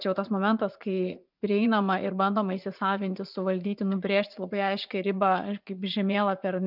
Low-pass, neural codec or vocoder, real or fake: 5.4 kHz; vocoder, 44.1 kHz, 80 mel bands, Vocos; fake